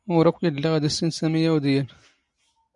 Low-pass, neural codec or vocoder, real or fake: 10.8 kHz; none; real